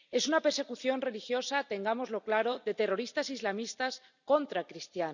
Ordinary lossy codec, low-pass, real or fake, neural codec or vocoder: none; 7.2 kHz; real; none